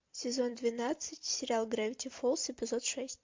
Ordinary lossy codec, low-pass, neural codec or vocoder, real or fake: MP3, 48 kbps; 7.2 kHz; vocoder, 44.1 kHz, 128 mel bands every 256 samples, BigVGAN v2; fake